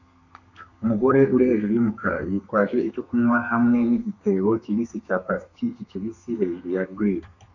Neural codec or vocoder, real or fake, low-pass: codec, 32 kHz, 1.9 kbps, SNAC; fake; 7.2 kHz